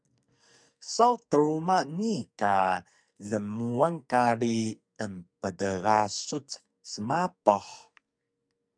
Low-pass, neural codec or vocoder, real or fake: 9.9 kHz; codec, 44.1 kHz, 2.6 kbps, SNAC; fake